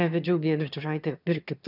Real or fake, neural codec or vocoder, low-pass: fake; autoencoder, 22.05 kHz, a latent of 192 numbers a frame, VITS, trained on one speaker; 5.4 kHz